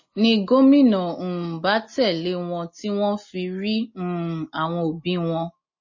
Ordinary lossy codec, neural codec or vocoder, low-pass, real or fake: MP3, 32 kbps; none; 7.2 kHz; real